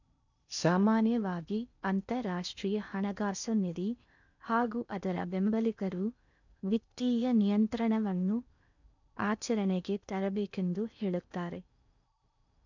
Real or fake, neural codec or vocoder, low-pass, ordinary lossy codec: fake; codec, 16 kHz in and 24 kHz out, 0.8 kbps, FocalCodec, streaming, 65536 codes; 7.2 kHz; none